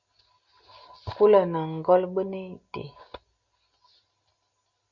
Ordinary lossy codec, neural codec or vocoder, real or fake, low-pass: Opus, 64 kbps; none; real; 7.2 kHz